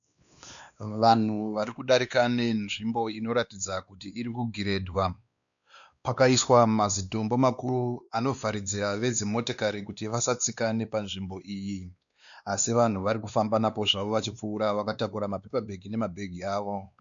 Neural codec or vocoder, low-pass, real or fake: codec, 16 kHz, 2 kbps, X-Codec, WavLM features, trained on Multilingual LibriSpeech; 7.2 kHz; fake